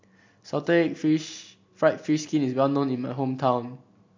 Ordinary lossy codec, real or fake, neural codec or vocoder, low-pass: MP3, 48 kbps; real; none; 7.2 kHz